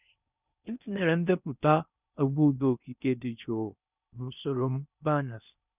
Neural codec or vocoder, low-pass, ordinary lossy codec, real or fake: codec, 16 kHz in and 24 kHz out, 0.6 kbps, FocalCodec, streaming, 4096 codes; 3.6 kHz; none; fake